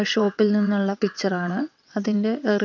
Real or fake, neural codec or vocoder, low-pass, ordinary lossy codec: fake; vocoder, 22.05 kHz, 80 mel bands, WaveNeXt; 7.2 kHz; none